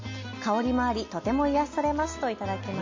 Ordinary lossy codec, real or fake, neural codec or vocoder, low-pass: MP3, 32 kbps; real; none; 7.2 kHz